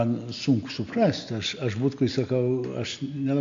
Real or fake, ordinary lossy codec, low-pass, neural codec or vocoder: real; MP3, 48 kbps; 7.2 kHz; none